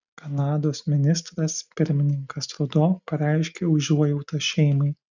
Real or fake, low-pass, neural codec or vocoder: real; 7.2 kHz; none